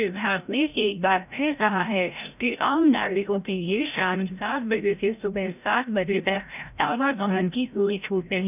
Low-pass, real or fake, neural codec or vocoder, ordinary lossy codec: 3.6 kHz; fake; codec, 16 kHz, 0.5 kbps, FreqCodec, larger model; none